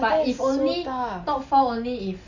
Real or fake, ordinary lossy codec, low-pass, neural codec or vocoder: real; none; 7.2 kHz; none